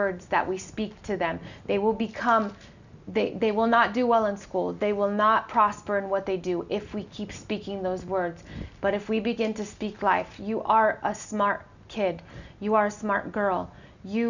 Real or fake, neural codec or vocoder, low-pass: real; none; 7.2 kHz